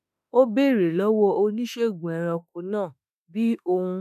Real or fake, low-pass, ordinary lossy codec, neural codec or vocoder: fake; 14.4 kHz; none; autoencoder, 48 kHz, 32 numbers a frame, DAC-VAE, trained on Japanese speech